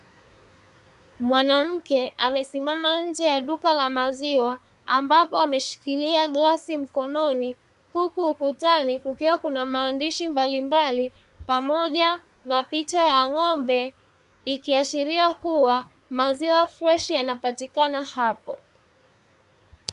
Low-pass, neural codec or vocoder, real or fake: 10.8 kHz; codec, 24 kHz, 1 kbps, SNAC; fake